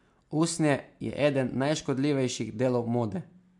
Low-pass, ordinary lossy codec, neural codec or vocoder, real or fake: 10.8 kHz; MP3, 64 kbps; none; real